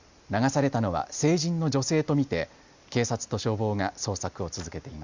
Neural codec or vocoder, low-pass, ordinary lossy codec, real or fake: none; 7.2 kHz; Opus, 64 kbps; real